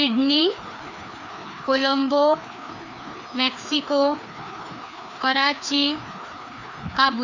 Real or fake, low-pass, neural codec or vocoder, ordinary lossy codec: fake; 7.2 kHz; codec, 16 kHz, 2 kbps, FreqCodec, larger model; none